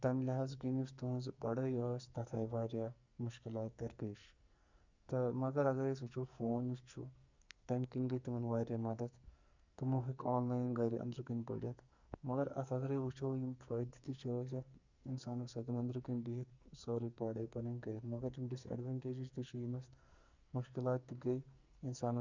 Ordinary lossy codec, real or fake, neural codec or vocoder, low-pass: Opus, 64 kbps; fake; codec, 44.1 kHz, 2.6 kbps, SNAC; 7.2 kHz